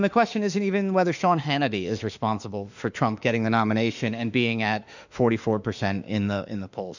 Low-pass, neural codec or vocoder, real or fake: 7.2 kHz; autoencoder, 48 kHz, 32 numbers a frame, DAC-VAE, trained on Japanese speech; fake